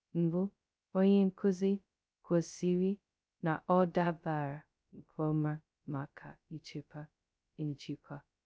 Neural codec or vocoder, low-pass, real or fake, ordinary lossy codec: codec, 16 kHz, 0.2 kbps, FocalCodec; none; fake; none